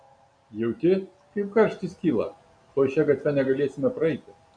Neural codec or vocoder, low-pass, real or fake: none; 9.9 kHz; real